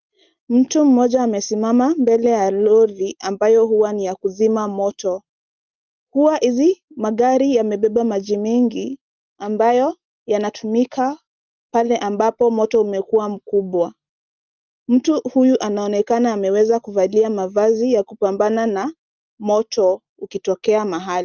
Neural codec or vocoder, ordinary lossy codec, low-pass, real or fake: none; Opus, 32 kbps; 7.2 kHz; real